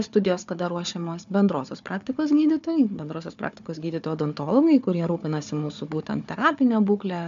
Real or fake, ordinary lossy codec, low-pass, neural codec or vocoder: fake; MP3, 64 kbps; 7.2 kHz; codec, 16 kHz, 4 kbps, FunCodec, trained on Chinese and English, 50 frames a second